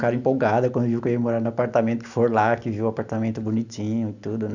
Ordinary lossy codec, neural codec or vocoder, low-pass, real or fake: none; none; 7.2 kHz; real